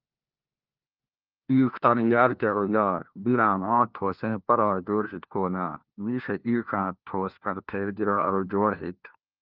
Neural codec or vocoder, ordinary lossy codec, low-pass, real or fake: codec, 16 kHz, 1 kbps, FunCodec, trained on LibriTTS, 50 frames a second; Opus, 32 kbps; 5.4 kHz; fake